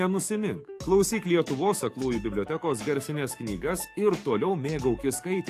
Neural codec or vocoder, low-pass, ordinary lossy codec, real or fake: codec, 44.1 kHz, 7.8 kbps, DAC; 14.4 kHz; AAC, 64 kbps; fake